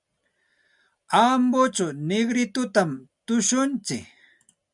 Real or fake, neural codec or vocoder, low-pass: real; none; 10.8 kHz